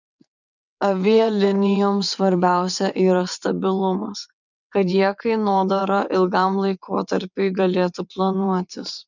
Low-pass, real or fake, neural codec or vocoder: 7.2 kHz; fake; vocoder, 22.05 kHz, 80 mel bands, WaveNeXt